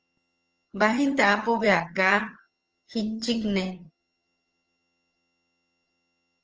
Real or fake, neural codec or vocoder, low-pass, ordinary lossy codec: fake; vocoder, 22.05 kHz, 80 mel bands, HiFi-GAN; 7.2 kHz; Opus, 24 kbps